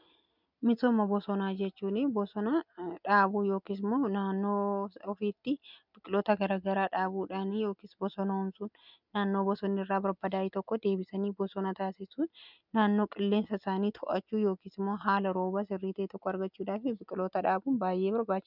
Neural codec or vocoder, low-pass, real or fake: none; 5.4 kHz; real